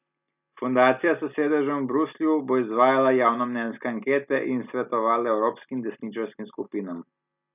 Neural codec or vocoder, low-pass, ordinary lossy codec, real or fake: none; 3.6 kHz; none; real